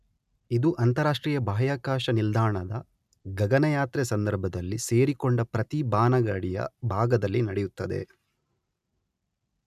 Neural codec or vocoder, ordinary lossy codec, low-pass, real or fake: none; none; 14.4 kHz; real